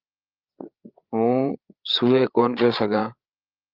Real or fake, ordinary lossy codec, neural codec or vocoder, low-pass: fake; Opus, 24 kbps; codec, 16 kHz, 8 kbps, FreqCodec, larger model; 5.4 kHz